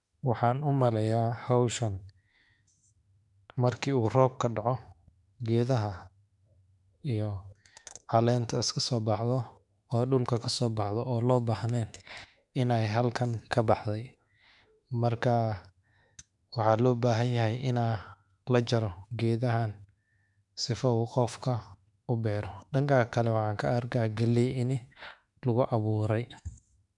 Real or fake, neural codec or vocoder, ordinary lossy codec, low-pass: fake; autoencoder, 48 kHz, 32 numbers a frame, DAC-VAE, trained on Japanese speech; none; 10.8 kHz